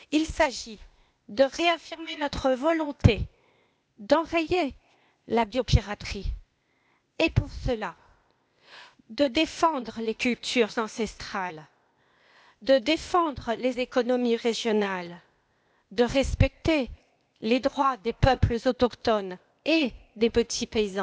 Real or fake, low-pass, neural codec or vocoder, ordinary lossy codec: fake; none; codec, 16 kHz, 0.8 kbps, ZipCodec; none